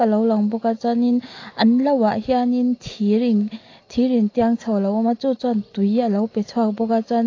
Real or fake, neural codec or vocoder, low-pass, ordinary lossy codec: real; none; 7.2 kHz; AAC, 32 kbps